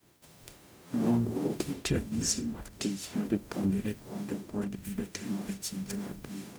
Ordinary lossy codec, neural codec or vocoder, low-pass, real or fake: none; codec, 44.1 kHz, 0.9 kbps, DAC; none; fake